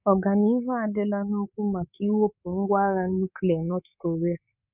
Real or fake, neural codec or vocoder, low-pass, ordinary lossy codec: fake; codec, 24 kHz, 3.1 kbps, DualCodec; 3.6 kHz; none